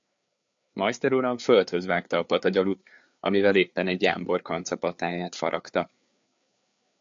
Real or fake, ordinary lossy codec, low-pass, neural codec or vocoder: fake; AAC, 64 kbps; 7.2 kHz; codec, 16 kHz, 4 kbps, FreqCodec, larger model